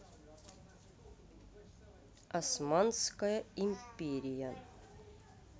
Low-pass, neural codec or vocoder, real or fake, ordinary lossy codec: none; none; real; none